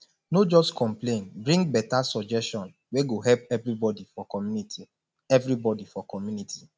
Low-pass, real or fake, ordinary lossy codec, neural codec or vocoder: none; real; none; none